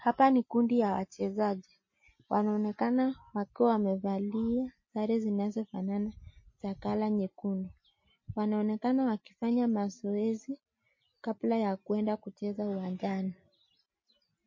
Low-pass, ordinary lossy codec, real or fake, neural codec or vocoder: 7.2 kHz; MP3, 32 kbps; real; none